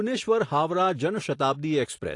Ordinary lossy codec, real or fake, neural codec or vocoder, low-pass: AAC, 48 kbps; real; none; 10.8 kHz